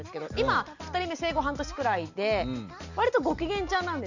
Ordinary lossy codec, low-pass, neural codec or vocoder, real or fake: none; 7.2 kHz; none; real